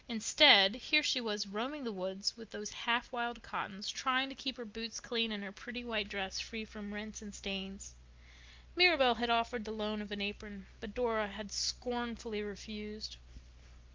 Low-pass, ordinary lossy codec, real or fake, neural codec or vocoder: 7.2 kHz; Opus, 24 kbps; real; none